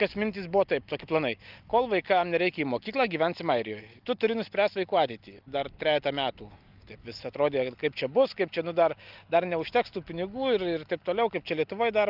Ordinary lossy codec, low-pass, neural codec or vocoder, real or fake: Opus, 24 kbps; 5.4 kHz; none; real